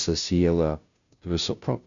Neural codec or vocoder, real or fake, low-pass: codec, 16 kHz, 0.5 kbps, FunCodec, trained on Chinese and English, 25 frames a second; fake; 7.2 kHz